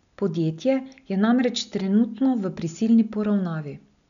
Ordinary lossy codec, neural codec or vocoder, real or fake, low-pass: none; none; real; 7.2 kHz